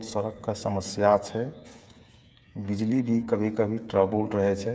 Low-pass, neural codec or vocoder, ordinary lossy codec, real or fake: none; codec, 16 kHz, 8 kbps, FreqCodec, smaller model; none; fake